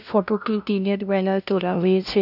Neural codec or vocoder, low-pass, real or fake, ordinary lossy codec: codec, 16 kHz, 0.5 kbps, FunCodec, trained on LibriTTS, 25 frames a second; 5.4 kHz; fake; none